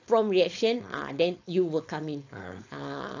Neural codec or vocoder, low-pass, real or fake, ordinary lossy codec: codec, 16 kHz, 4.8 kbps, FACodec; 7.2 kHz; fake; AAC, 48 kbps